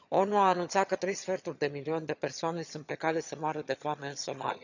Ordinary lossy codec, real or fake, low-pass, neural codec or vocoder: none; fake; 7.2 kHz; vocoder, 22.05 kHz, 80 mel bands, HiFi-GAN